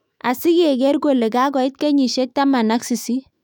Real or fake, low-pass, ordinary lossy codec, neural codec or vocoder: fake; 19.8 kHz; none; autoencoder, 48 kHz, 128 numbers a frame, DAC-VAE, trained on Japanese speech